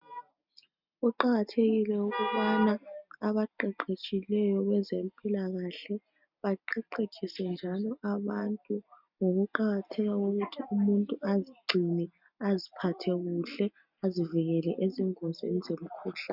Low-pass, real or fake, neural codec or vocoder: 5.4 kHz; real; none